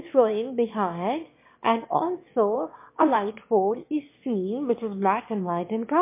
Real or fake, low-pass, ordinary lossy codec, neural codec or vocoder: fake; 3.6 kHz; MP3, 24 kbps; autoencoder, 22.05 kHz, a latent of 192 numbers a frame, VITS, trained on one speaker